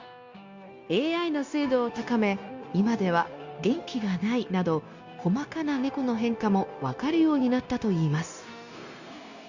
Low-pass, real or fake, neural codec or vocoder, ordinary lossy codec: 7.2 kHz; fake; codec, 16 kHz, 0.9 kbps, LongCat-Audio-Codec; Opus, 64 kbps